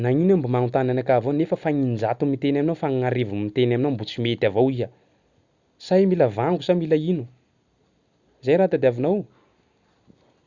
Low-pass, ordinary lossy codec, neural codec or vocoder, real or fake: 7.2 kHz; Opus, 64 kbps; none; real